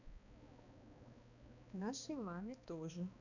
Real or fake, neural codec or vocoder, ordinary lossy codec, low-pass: fake; codec, 16 kHz, 2 kbps, X-Codec, HuBERT features, trained on balanced general audio; none; 7.2 kHz